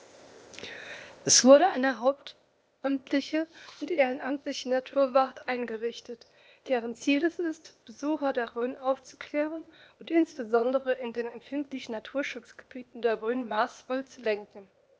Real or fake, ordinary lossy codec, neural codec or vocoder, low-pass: fake; none; codec, 16 kHz, 0.8 kbps, ZipCodec; none